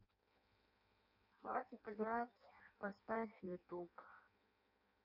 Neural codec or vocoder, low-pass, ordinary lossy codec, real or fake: codec, 16 kHz in and 24 kHz out, 0.6 kbps, FireRedTTS-2 codec; 5.4 kHz; none; fake